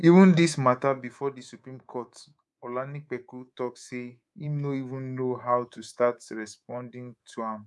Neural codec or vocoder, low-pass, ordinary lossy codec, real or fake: codec, 24 kHz, 3.1 kbps, DualCodec; none; none; fake